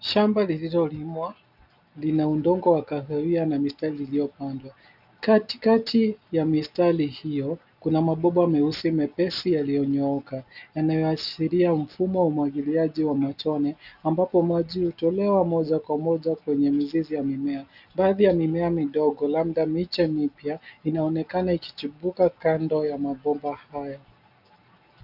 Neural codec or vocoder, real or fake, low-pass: none; real; 5.4 kHz